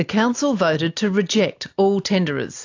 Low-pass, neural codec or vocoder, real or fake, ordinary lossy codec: 7.2 kHz; none; real; AAC, 48 kbps